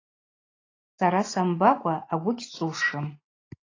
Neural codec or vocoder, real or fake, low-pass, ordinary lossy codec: none; real; 7.2 kHz; AAC, 32 kbps